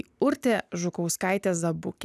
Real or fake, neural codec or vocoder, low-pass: real; none; 14.4 kHz